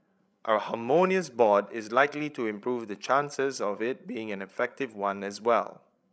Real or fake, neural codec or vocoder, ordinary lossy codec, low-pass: fake; codec, 16 kHz, 16 kbps, FreqCodec, larger model; none; none